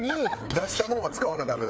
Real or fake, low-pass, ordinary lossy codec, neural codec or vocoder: fake; none; none; codec, 16 kHz, 4 kbps, FreqCodec, larger model